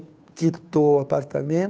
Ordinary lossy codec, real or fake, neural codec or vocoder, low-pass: none; fake; codec, 16 kHz, 2 kbps, FunCodec, trained on Chinese and English, 25 frames a second; none